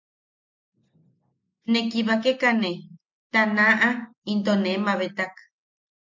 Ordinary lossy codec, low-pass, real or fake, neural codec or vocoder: MP3, 48 kbps; 7.2 kHz; real; none